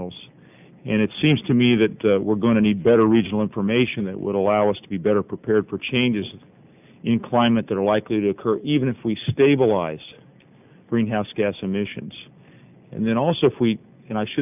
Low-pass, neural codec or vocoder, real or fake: 3.6 kHz; none; real